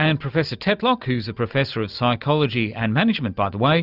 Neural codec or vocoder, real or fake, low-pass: none; real; 5.4 kHz